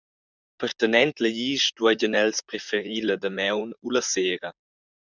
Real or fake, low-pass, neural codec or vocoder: fake; 7.2 kHz; vocoder, 44.1 kHz, 128 mel bands, Pupu-Vocoder